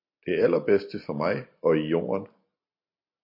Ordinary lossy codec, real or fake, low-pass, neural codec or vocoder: MP3, 32 kbps; real; 5.4 kHz; none